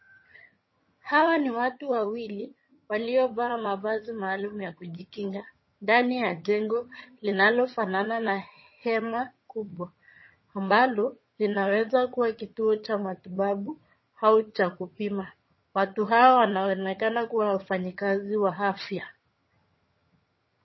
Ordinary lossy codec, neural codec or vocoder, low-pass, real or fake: MP3, 24 kbps; vocoder, 22.05 kHz, 80 mel bands, HiFi-GAN; 7.2 kHz; fake